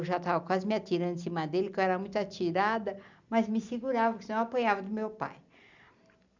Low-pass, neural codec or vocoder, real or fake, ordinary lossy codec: 7.2 kHz; none; real; none